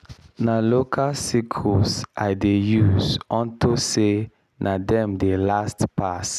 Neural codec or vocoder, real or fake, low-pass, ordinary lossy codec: none; real; 14.4 kHz; none